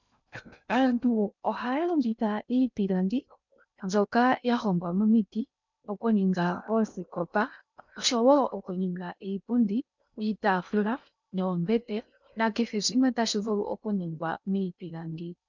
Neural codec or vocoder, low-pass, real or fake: codec, 16 kHz in and 24 kHz out, 0.6 kbps, FocalCodec, streaming, 2048 codes; 7.2 kHz; fake